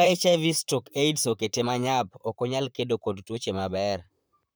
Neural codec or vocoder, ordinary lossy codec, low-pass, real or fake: vocoder, 44.1 kHz, 128 mel bands, Pupu-Vocoder; none; none; fake